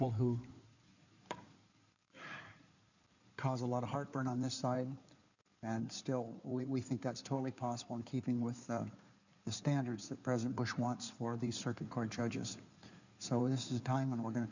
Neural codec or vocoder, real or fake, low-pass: codec, 16 kHz in and 24 kHz out, 2.2 kbps, FireRedTTS-2 codec; fake; 7.2 kHz